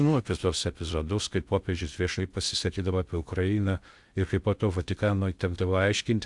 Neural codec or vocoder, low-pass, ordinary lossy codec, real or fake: codec, 16 kHz in and 24 kHz out, 0.6 kbps, FocalCodec, streaming, 2048 codes; 10.8 kHz; Opus, 64 kbps; fake